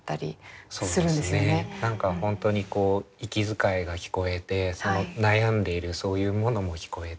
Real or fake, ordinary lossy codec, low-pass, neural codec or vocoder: real; none; none; none